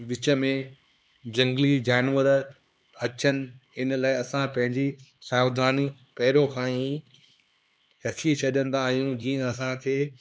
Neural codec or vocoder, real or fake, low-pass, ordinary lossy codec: codec, 16 kHz, 2 kbps, X-Codec, HuBERT features, trained on LibriSpeech; fake; none; none